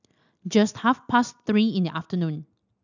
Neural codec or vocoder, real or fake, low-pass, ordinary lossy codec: none; real; 7.2 kHz; none